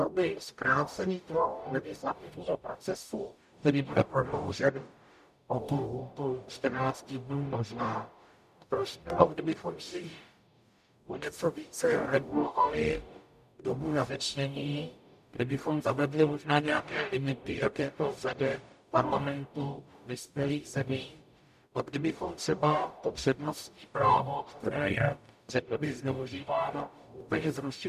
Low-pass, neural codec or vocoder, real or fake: 14.4 kHz; codec, 44.1 kHz, 0.9 kbps, DAC; fake